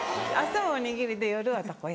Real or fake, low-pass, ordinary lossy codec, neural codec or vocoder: real; none; none; none